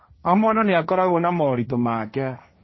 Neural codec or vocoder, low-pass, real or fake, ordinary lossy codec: codec, 16 kHz, 1.1 kbps, Voila-Tokenizer; 7.2 kHz; fake; MP3, 24 kbps